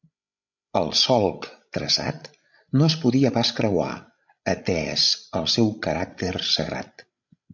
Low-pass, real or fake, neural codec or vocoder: 7.2 kHz; fake; codec, 16 kHz, 16 kbps, FreqCodec, larger model